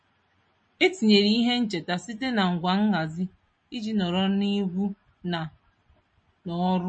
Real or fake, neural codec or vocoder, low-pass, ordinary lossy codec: real; none; 9.9 kHz; MP3, 32 kbps